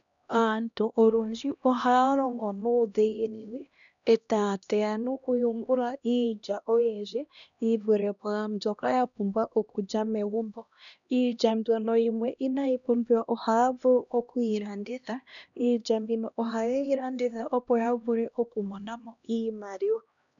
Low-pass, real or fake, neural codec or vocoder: 7.2 kHz; fake; codec, 16 kHz, 1 kbps, X-Codec, HuBERT features, trained on LibriSpeech